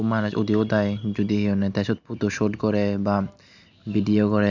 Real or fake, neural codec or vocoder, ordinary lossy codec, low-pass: real; none; MP3, 64 kbps; 7.2 kHz